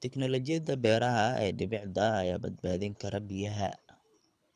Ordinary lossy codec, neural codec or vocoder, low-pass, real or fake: none; codec, 24 kHz, 6 kbps, HILCodec; none; fake